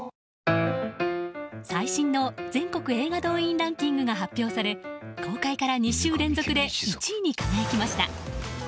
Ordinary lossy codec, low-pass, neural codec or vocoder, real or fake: none; none; none; real